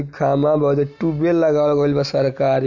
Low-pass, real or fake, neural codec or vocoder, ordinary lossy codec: 7.2 kHz; real; none; none